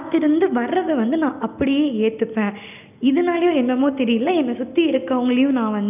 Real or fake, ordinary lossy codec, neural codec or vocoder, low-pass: real; none; none; 3.6 kHz